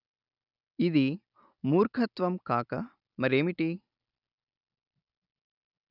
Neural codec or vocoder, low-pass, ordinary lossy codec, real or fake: none; 5.4 kHz; none; real